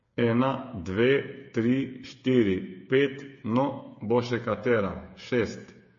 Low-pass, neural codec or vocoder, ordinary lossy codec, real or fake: 7.2 kHz; codec, 16 kHz, 8 kbps, FreqCodec, smaller model; MP3, 32 kbps; fake